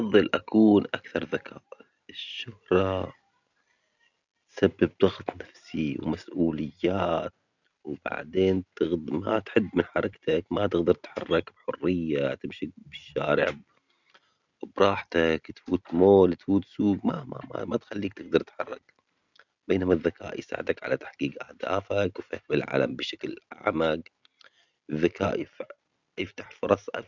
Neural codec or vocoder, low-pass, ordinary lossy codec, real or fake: none; 7.2 kHz; none; real